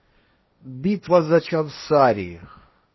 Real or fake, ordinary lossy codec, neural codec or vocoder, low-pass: fake; MP3, 24 kbps; codec, 16 kHz, 1.1 kbps, Voila-Tokenizer; 7.2 kHz